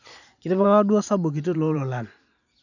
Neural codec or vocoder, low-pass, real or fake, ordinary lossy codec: vocoder, 44.1 kHz, 128 mel bands every 512 samples, BigVGAN v2; 7.2 kHz; fake; none